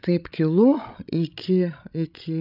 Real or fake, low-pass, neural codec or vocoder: fake; 5.4 kHz; codec, 16 kHz, 16 kbps, FreqCodec, larger model